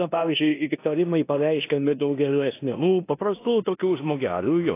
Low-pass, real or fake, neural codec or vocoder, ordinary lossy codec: 3.6 kHz; fake; codec, 16 kHz in and 24 kHz out, 0.9 kbps, LongCat-Audio-Codec, four codebook decoder; AAC, 24 kbps